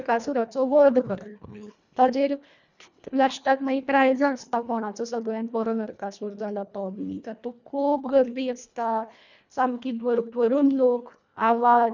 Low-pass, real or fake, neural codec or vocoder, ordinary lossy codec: 7.2 kHz; fake; codec, 24 kHz, 1.5 kbps, HILCodec; none